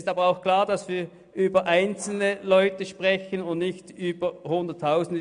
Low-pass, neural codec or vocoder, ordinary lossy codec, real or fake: 9.9 kHz; none; AAC, 96 kbps; real